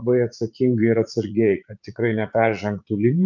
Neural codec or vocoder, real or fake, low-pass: vocoder, 44.1 kHz, 80 mel bands, Vocos; fake; 7.2 kHz